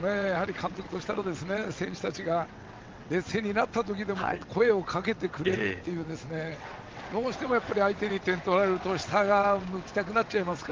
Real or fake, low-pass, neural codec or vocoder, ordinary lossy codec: fake; 7.2 kHz; vocoder, 22.05 kHz, 80 mel bands, WaveNeXt; Opus, 32 kbps